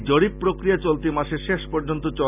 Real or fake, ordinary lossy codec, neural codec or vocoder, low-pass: real; none; none; 3.6 kHz